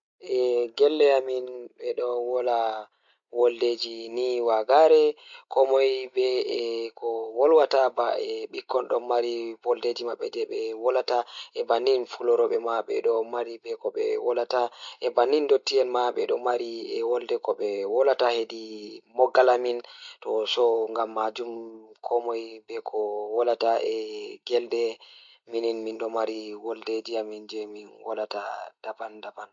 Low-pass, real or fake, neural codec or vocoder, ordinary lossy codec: 7.2 kHz; real; none; MP3, 48 kbps